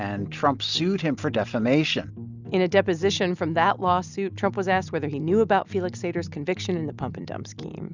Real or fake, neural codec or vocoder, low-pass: real; none; 7.2 kHz